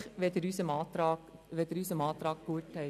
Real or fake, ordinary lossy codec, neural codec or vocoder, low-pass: real; none; none; 14.4 kHz